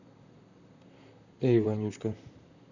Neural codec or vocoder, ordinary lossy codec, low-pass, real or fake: vocoder, 44.1 kHz, 128 mel bands, Pupu-Vocoder; none; 7.2 kHz; fake